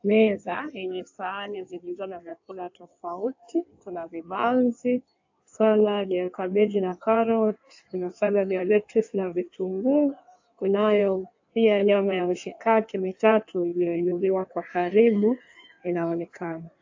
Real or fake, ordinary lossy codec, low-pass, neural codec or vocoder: fake; AAC, 48 kbps; 7.2 kHz; codec, 16 kHz in and 24 kHz out, 1.1 kbps, FireRedTTS-2 codec